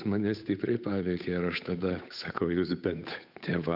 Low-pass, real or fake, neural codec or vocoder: 5.4 kHz; fake; codec, 16 kHz, 8 kbps, FunCodec, trained on Chinese and English, 25 frames a second